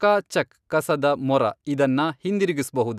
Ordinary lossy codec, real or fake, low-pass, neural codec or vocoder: none; real; 14.4 kHz; none